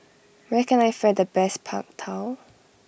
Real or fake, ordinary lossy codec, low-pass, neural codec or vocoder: real; none; none; none